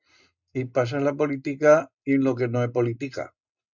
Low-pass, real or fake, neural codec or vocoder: 7.2 kHz; real; none